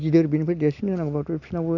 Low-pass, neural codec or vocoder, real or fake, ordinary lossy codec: 7.2 kHz; none; real; none